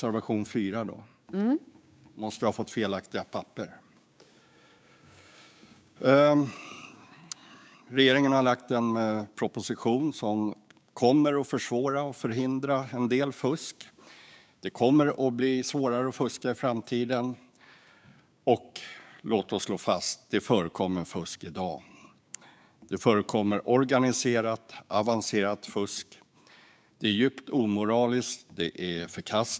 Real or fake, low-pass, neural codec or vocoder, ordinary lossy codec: fake; none; codec, 16 kHz, 6 kbps, DAC; none